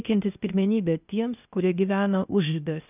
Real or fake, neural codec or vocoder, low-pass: fake; codec, 16 kHz, 0.8 kbps, ZipCodec; 3.6 kHz